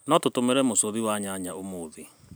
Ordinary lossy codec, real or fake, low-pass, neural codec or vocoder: none; real; none; none